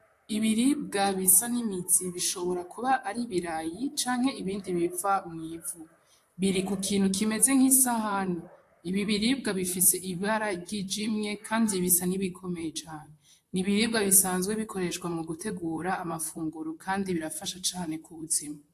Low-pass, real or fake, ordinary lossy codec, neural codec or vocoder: 14.4 kHz; fake; AAC, 64 kbps; vocoder, 44.1 kHz, 128 mel bands, Pupu-Vocoder